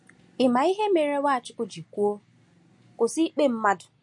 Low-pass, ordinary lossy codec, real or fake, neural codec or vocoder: 10.8 kHz; MP3, 48 kbps; real; none